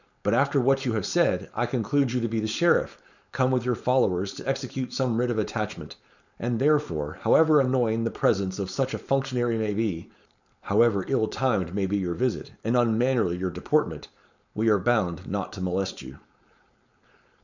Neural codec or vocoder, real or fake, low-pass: codec, 16 kHz, 4.8 kbps, FACodec; fake; 7.2 kHz